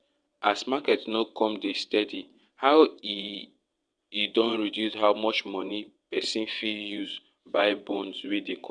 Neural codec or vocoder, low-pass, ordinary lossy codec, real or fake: vocoder, 22.05 kHz, 80 mel bands, WaveNeXt; 9.9 kHz; none; fake